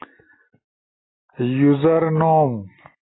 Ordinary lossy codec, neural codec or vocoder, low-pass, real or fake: AAC, 16 kbps; none; 7.2 kHz; real